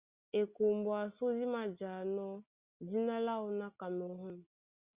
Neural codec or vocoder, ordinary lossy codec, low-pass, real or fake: none; Opus, 64 kbps; 3.6 kHz; real